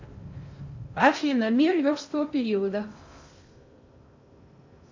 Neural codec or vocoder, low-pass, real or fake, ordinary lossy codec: codec, 16 kHz in and 24 kHz out, 0.6 kbps, FocalCodec, streaming, 4096 codes; 7.2 kHz; fake; MP3, 48 kbps